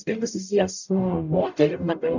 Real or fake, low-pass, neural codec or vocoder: fake; 7.2 kHz; codec, 44.1 kHz, 0.9 kbps, DAC